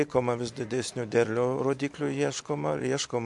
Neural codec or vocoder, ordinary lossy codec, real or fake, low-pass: none; MP3, 64 kbps; real; 10.8 kHz